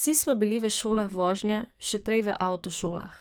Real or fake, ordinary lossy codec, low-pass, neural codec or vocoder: fake; none; none; codec, 44.1 kHz, 2.6 kbps, SNAC